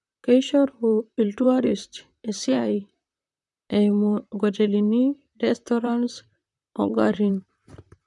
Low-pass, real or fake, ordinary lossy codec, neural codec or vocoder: 10.8 kHz; fake; none; vocoder, 44.1 kHz, 128 mel bands, Pupu-Vocoder